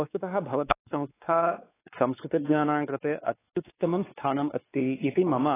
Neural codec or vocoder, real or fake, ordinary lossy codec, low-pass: codec, 16 kHz, 4 kbps, X-Codec, WavLM features, trained on Multilingual LibriSpeech; fake; AAC, 16 kbps; 3.6 kHz